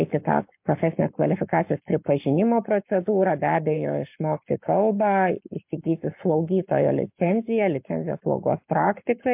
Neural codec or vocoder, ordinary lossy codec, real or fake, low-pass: codec, 44.1 kHz, 7.8 kbps, Pupu-Codec; MP3, 32 kbps; fake; 3.6 kHz